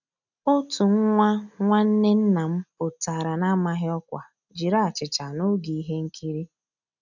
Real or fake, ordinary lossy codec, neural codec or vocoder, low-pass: real; none; none; 7.2 kHz